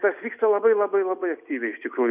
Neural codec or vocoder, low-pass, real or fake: none; 3.6 kHz; real